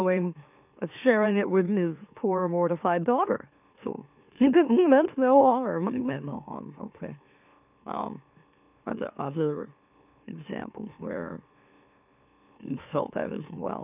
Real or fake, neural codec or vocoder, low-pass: fake; autoencoder, 44.1 kHz, a latent of 192 numbers a frame, MeloTTS; 3.6 kHz